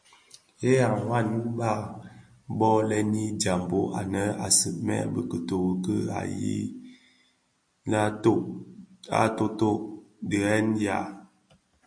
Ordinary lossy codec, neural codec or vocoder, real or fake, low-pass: MP3, 48 kbps; none; real; 9.9 kHz